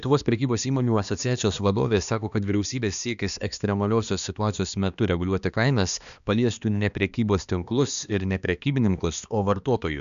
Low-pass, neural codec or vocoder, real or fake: 7.2 kHz; codec, 16 kHz, 2 kbps, X-Codec, HuBERT features, trained on balanced general audio; fake